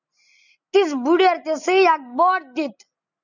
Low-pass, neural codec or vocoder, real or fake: 7.2 kHz; none; real